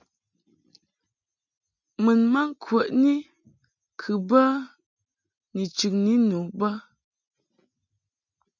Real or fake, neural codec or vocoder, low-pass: real; none; 7.2 kHz